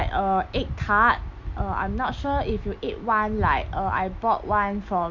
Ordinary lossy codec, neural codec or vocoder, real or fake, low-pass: none; none; real; 7.2 kHz